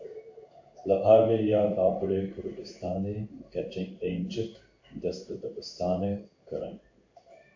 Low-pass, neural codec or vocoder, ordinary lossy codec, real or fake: 7.2 kHz; codec, 16 kHz in and 24 kHz out, 1 kbps, XY-Tokenizer; AAC, 48 kbps; fake